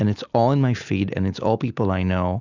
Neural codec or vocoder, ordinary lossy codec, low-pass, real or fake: none; Opus, 64 kbps; 7.2 kHz; real